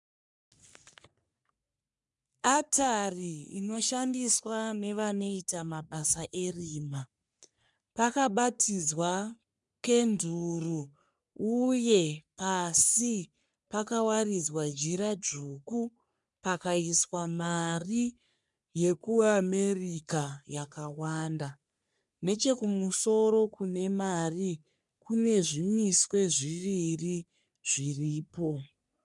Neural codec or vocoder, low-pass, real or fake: codec, 44.1 kHz, 3.4 kbps, Pupu-Codec; 10.8 kHz; fake